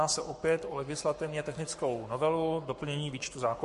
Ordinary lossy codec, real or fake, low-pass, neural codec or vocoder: MP3, 48 kbps; fake; 14.4 kHz; codec, 44.1 kHz, 7.8 kbps, Pupu-Codec